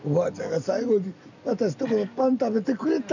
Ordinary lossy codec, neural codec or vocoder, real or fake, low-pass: none; none; real; 7.2 kHz